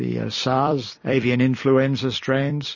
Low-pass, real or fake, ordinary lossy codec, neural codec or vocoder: 7.2 kHz; fake; MP3, 32 kbps; vocoder, 44.1 kHz, 128 mel bands every 256 samples, BigVGAN v2